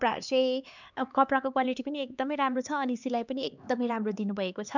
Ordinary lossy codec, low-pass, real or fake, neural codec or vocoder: none; 7.2 kHz; fake; codec, 16 kHz, 4 kbps, X-Codec, HuBERT features, trained on LibriSpeech